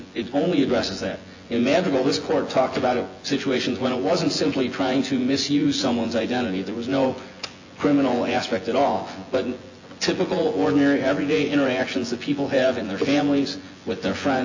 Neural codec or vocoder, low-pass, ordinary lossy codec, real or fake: vocoder, 24 kHz, 100 mel bands, Vocos; 7.2 kHz; AAC, 48 kbps; fake